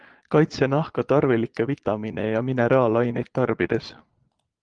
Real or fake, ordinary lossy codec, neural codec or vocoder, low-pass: fake; Opus, 24 kbps; vocoder, 22.05 kHz, 80 mel bands, Vocos; 9.9 kHz